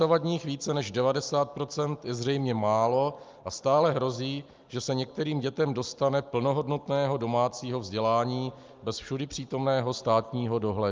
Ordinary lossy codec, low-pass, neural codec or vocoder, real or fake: Opus, 24 kbps; 7.2 kHz; none; real